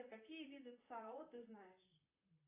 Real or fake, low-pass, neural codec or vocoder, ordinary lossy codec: fake; 3.6 kHz; vocoder, 44.1 kHz, 128 mel bands, Pupu-Vocoder; AAC, 24 kbps